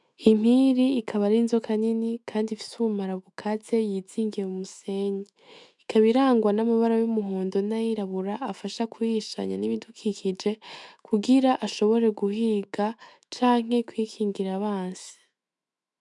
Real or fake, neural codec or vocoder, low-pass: fake; autoencoder, 48 kHz, 128 numbers a frame, DAC-VAE, trained on Japanese speech; 10.8 kHz